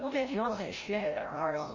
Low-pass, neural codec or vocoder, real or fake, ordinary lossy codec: 7.2 kHz; codec, 16 kHz, 0.5 kbps, FreqCodec, larger model; fake; MP3, 32 kbps